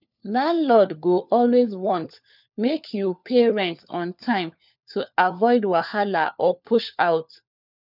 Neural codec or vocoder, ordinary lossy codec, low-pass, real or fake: codec, 16 kHz, 4 kbps, FunCodec, trained on LibriTTS, 50 frames a second; none; 5.4 kHz; fake